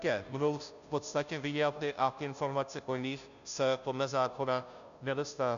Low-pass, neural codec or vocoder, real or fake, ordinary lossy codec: 7.2 kHz; codec, 16 kHz, 0.5 kbps, FunCodec, trained on Chinese and English, 25 frames a second; fake; MP3, 96 kbps